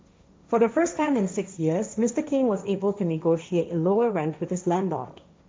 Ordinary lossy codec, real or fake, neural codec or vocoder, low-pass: none; fake; codec, 16 kHz, 1.1 kbps, Voila-Tokenizer; none